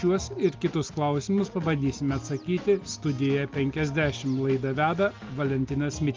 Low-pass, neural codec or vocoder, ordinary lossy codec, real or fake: 7.2 kHz; none; Opus, 32 kbps; real